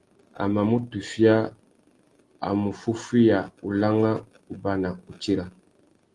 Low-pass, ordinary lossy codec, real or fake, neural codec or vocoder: 10.8 kHz; Opus, 24 kbps; real; none